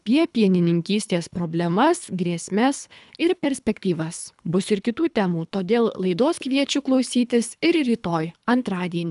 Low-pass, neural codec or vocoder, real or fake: 10.8 kHz; codec, 24 kHz, 3 kbps, HILCodec; fake